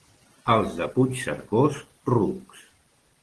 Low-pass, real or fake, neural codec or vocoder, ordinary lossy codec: 10.8 kHz; real; none; Opus, 16 kbps